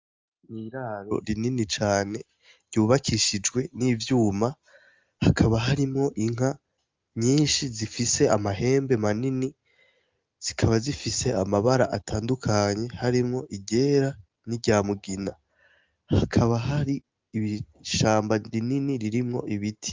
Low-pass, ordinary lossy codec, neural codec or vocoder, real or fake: 7.2 kHz; Opus, 32 kbps; none; real